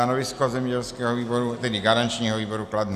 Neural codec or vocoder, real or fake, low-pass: none; real; 14.4 kHz